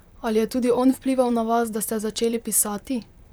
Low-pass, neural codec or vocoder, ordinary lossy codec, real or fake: none; vocoder, 44.1 kHz, 128 mel bands, Pupu-Vocoder; none; fake